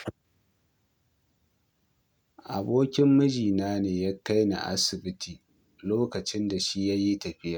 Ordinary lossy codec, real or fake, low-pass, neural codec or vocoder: none; real; none; none